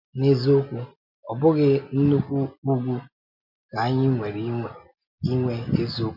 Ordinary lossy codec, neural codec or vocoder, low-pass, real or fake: MP3, 48 kbps; none; 5.4 kHz; real